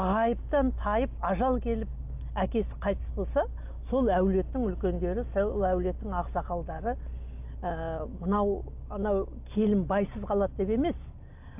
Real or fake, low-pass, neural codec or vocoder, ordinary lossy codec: real; 3.6 kHz; none; AAC, 32 kbps